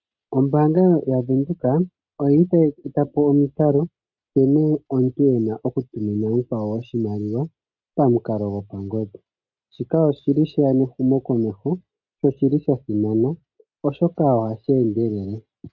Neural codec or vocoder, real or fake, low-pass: none; real; 7.2 kHz